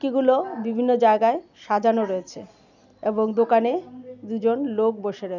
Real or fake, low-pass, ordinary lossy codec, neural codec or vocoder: real; 7.2 kHz; none; none